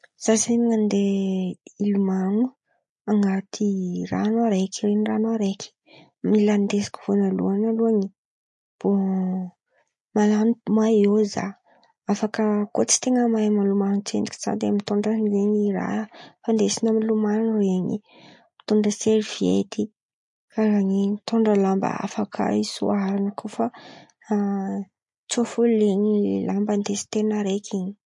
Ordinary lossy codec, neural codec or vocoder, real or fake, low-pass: MP3, 48 kbps; none; real; 10.8 kHz